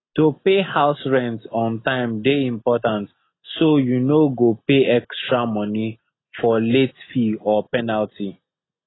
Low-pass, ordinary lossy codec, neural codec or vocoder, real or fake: 7.2 kHz; AAC, 16 kbps; none; real